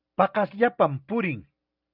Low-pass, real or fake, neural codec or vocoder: 5.4 kHz; real; none